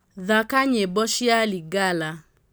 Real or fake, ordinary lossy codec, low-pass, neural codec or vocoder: real; none; none; none